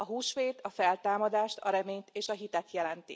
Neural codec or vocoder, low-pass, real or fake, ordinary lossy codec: none; none; real; none